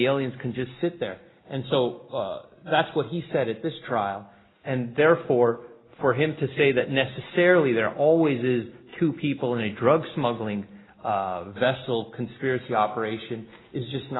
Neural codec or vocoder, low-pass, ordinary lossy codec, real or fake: none; 7.2 kHz; AAC, 16 kbps; real